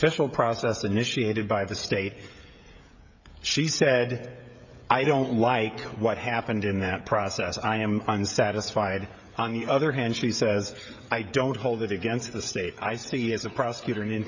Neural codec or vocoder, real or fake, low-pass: codec, 16 kHz, 16 kbps, FreqCodec, smaller model; fake; 7.2 kHz